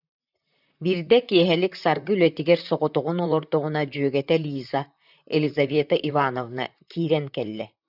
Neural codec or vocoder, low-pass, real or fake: vocoder, 44.1 kHz, 128 mel bands, Pupu-Vocoder; 5.4 kHz; fake